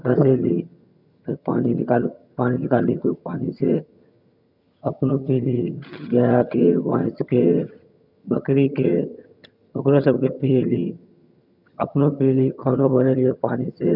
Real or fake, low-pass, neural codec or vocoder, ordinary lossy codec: fake; 5.4 kHz; vocoder, 22.05 kHz, 80 mel bands, HiFi-GAN; none